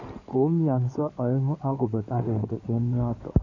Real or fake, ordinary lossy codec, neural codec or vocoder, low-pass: fake; none; codec, 16 kHz in and 24 kHz out, 2.2 kbps, FireRedTTS-2 codec; 7.2 kHz